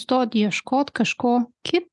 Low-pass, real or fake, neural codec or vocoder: 10.8 kHz; real; none